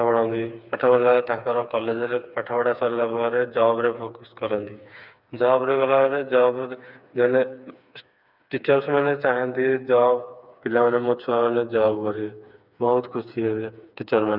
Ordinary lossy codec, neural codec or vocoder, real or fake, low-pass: none; codec, 16 kHz, 4 kbps, FreqCodec, smaller model; fake; 5.4 kHz